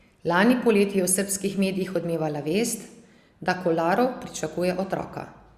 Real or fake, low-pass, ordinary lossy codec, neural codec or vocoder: real; 14.4 kHz; Opus, 64 kbps; none